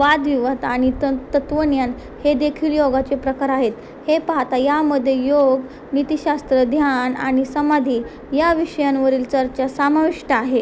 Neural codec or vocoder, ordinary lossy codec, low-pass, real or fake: none; none; none; real